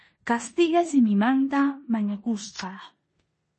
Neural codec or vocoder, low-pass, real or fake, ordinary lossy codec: codec, 16 kHz in and 24 kHz out, 0.9 kbps, LongCat-Audio-Codec, four codebook decoder; 10.8 kHz; fake; MP3, 32 kbps